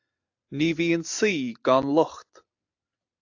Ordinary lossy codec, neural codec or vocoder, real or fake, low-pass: AAC, 48 kbps; none; real; 7.2 kHz